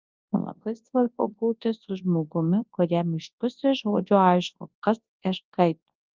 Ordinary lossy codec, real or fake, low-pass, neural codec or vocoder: Opus, 32 kbps; fake; 7.2 kHz; codec, 24 kHz, 0.9 kbps, WavTokenizer, large speech release